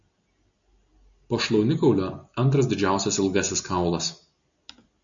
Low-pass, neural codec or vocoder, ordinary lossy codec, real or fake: 7.2 kHz; none; MP3, 64 kbps; real